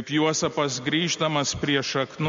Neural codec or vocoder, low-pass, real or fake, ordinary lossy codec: none; 7.2 kHz; real; MP3, 48 kbps